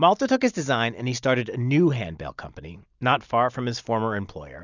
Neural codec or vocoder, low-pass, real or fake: none; 7.2 kHz; real